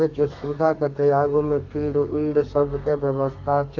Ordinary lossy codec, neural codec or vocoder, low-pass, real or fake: AAC, 48 kbps; codec, 32 kHz, 1.9 kbps, SNAC; 7.2 kHz; fake